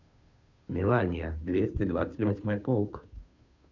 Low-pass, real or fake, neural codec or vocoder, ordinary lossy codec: 7.2 kHz; fake; codec, 16 kHz, 2 kbps, FunCodec, trained on Chinese and English, 25 frames a second; none